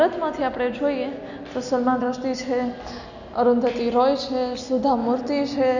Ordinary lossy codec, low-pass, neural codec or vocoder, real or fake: none; 7.2 kHz; none; real